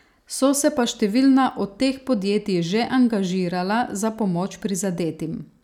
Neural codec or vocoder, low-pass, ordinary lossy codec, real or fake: none; 19.8 kHz; none; real